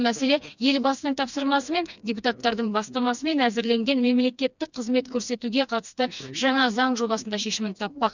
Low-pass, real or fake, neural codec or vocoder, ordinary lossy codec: 7.2 kHz; fake; codec, 16 kHz, 2 kbps, FreqCodec, smaller model; none